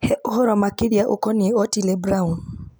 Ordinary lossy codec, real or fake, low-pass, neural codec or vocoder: none; real; none; none